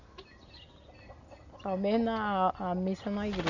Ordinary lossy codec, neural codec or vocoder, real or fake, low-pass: none; none; real; 7.2 kHz